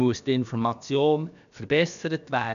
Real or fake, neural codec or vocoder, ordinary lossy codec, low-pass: fake; codec, 16 kHz, about 1 kbps, DyCAST, with the encoder's durations; none; 7.2 kHz